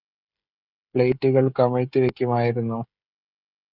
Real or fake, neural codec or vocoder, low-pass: fake; codec, 16 kHz, 16 kbps, FreqCodec, smaller model; 5.4 kHz